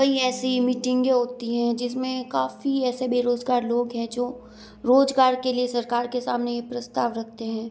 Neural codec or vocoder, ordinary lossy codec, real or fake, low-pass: none; none; real; none